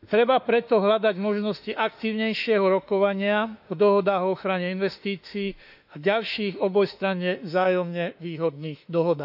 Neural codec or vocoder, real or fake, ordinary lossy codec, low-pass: autoencoder, 48 kHz, 32 numbers a frame, DAC-VAE, trained on Japanese speech; fake; none; 5.4 kHz